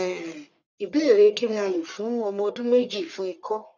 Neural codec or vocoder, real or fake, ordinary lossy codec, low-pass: codec, 44.1 kHz, 1.7 kbps, Pupu-Codec; fake; none; 7.2 kHz